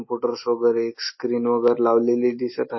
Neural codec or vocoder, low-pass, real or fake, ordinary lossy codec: none; 7.2 kHz; real; MP3, 24 kbps